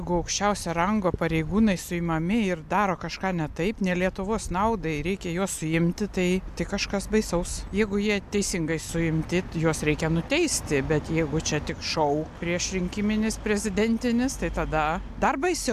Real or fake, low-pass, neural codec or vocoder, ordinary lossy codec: real; 14.4 kHz; none; AAC, 96 kbps